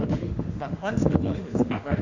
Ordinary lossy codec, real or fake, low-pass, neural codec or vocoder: none; fake; 7.2 kHz; autoencoder, 48 kHz, 32 numbers a frame, DAC-VAE, trained on Japanese speech